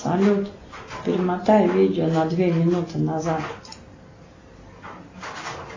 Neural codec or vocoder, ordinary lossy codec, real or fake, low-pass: none; AAC, 32 kbps; real; 7.2 kHz